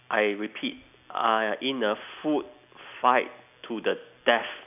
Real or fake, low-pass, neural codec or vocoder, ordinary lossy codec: real; 3.6 kHz; none; none